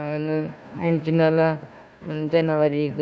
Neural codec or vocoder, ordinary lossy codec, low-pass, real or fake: codec, 16 kHz, 1 kbps, FunCodec, trained on Chinese and English, 50 frames a second; none; none; fake